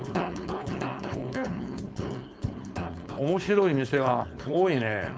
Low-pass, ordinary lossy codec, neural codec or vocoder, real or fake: none; none; codec, 16 kHz, 4.8 kbps, FACodec; fake